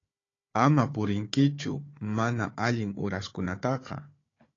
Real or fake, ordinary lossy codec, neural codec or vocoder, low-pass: fake; AAC, 32 kbps; codec, 16 kHz, 4 kbps, FunCodec, trained on Chinese and English, 50 frames a second; 7.2 kHz